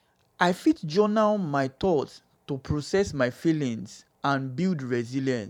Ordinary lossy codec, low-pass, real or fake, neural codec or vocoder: none; 19.8 kHz; fake; vocoder, 44.1 kHz, 128 mel bands every 512 samples, BigVGAN v2